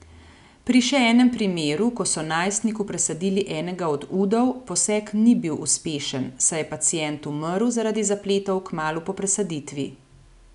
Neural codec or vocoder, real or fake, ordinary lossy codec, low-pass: none; real; none; 10.8 kHz